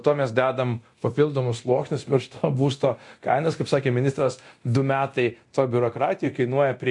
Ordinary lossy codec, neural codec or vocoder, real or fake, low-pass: MP3, 64 kbps; codec, 24 kHz, 0.9 kbps, DualCodec; fake; 10.8 kHz